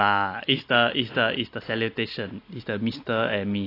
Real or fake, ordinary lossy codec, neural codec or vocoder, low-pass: real; AAC, 32 kbps; none; 5.4 kHz